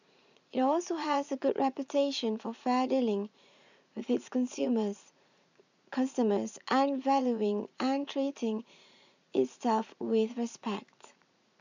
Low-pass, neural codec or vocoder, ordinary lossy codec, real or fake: 7.2 kHz; vocoder, 44.1 kHz, 80 mel bands, Vocos; none; fake